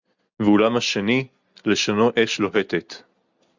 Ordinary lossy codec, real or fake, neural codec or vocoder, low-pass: Opus, 64 kbps; real; none; 7.2 kHz